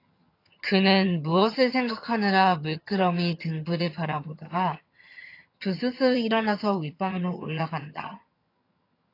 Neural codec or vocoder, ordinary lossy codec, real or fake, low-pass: vocoder, 22.05 kHz, 80 mel bands, HiFi-GAN; AAC, 32 kbps; fake; 5.4 kHz